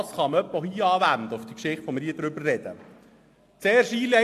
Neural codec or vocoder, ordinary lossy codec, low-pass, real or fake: none; AAC, 64 kbps; 14.4 kHz; real